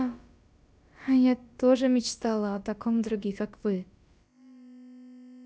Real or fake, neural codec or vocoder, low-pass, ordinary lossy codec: fake; codec, 16 kHz, about 1 kbps, DyCAST, with the encoder's durations; none; none